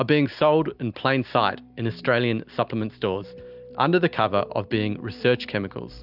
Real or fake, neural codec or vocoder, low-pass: real; none; 5.4 kHz